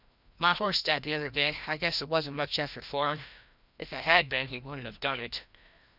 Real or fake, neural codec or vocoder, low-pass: fake; codec, 16 kHz, 1 kbps, FreqCodec, larger model; 5.4 kHz